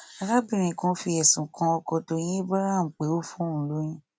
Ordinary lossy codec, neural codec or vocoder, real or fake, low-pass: none; none; real; none